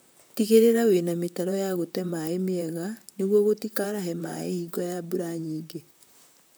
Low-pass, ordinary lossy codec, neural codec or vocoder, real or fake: none; none; vocoder, 44.1 kHz, 128 mel bands, Pupu-Vocoder; fake